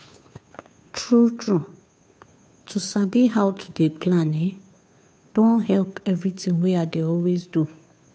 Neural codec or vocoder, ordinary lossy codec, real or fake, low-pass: codec, 16 kHz, 2 kbps, FunCodec, trained on Chinese and English, 25 frames a second; none; fake; none